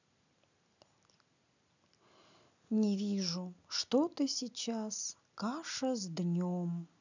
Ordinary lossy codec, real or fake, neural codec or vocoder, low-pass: none; real; none; 7.2 kHz